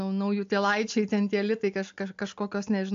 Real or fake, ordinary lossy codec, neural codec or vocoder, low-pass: real; AAC, 64 kbps; none; 7.2 kHz